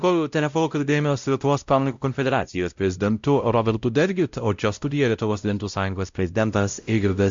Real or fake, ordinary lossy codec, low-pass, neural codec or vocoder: fake; Opus, 64 kbps; 7.2 kHz; codec, 16 kHz, 0.5 kbps, X-Codec, WavLM features, trained on Multilingual LibriSpeech